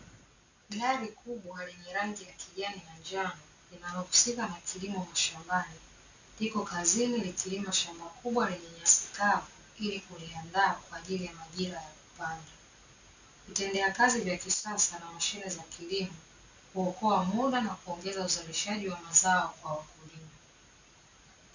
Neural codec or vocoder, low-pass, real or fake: none; 7.2 kHz; real